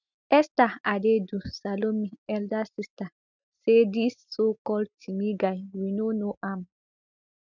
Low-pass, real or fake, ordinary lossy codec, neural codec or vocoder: 7.2 kHz; real; none; none